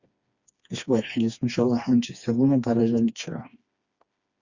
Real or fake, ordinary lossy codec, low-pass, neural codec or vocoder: fake; Opus, 64 kbps; 7.2 kHz; codec, 16 kHz, 2 kbps, FreqCodec, smaller model